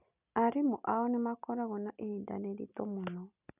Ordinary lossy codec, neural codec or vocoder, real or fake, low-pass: none; none; real; 3.6 kHz